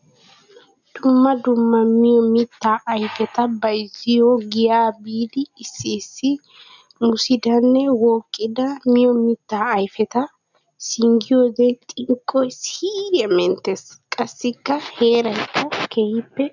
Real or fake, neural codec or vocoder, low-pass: real; none; 7.2 kHz